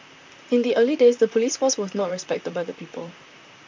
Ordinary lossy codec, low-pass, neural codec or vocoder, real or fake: MP3, 64 kbps; 7.2 kHz; vocoder, 44.1 kHz, 128 mel bands, Pupu-Vocoder; fake